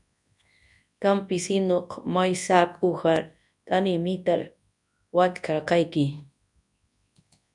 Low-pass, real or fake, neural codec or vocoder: 10.8 kHz; fake; codec, 24 kHz, 0.9 kbps, WavTokenizer, large speech release